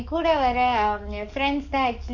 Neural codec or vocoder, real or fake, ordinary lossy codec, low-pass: codec, 44.1 kHz, 7.8 kbps, DAC; fake; none; 7.2 kHz